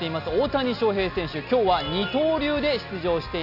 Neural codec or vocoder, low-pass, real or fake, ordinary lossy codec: none; 5.4 kHz; real; none